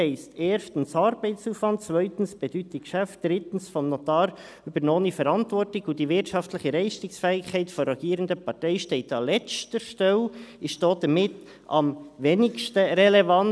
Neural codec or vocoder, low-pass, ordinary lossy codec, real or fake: none; none; none; real